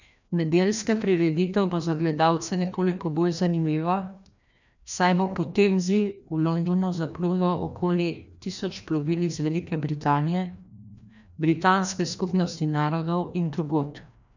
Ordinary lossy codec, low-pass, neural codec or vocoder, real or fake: none; 7.2 kHz; codec, 16 kHz, 1 kbps, FreqCodec, larger model; fake